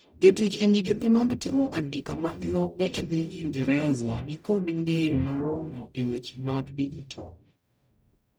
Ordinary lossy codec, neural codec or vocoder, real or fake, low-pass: none; codec, 44.1 kHz, 0.9 kbps, DAC; fake; none